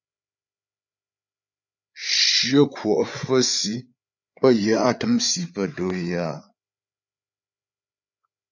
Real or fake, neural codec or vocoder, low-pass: fake; codec, 16 kHz, 8 kbps, FreqCodec, larger model; 7.2 kHz